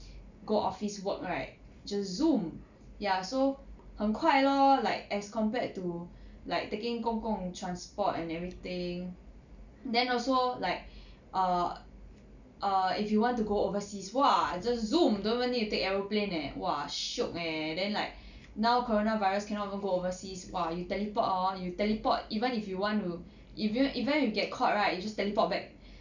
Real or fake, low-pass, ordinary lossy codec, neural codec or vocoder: real; 7.2 kHz; none; none